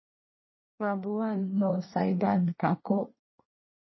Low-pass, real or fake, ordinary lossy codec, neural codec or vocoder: 7.2 kHz; fake; MP3, 24 kbps; codec, 24 kHz, 1 kbps, SNAC